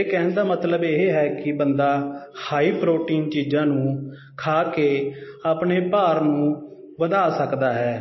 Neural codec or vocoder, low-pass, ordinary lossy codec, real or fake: none; 7.2 kHz; MP3, 24 kbps; real